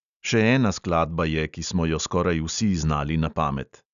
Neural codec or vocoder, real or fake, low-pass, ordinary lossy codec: none; real; 7.2 kHz; none